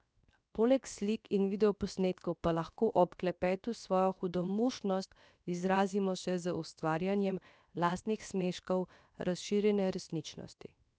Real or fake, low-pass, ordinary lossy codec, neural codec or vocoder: fake; none; none; codec, 16 kHz, 0.7 kbps, FocalCodec